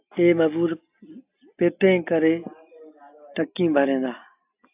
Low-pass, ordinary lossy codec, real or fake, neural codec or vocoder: 3.6 kHz; AAC, 32 kbps; real; none